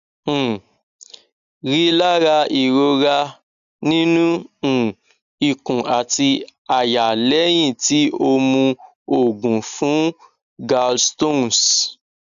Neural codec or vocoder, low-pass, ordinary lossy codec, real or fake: none; 7.2 kHz; none; real